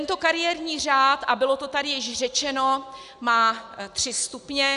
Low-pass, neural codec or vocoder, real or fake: 10.8 kHz; none; real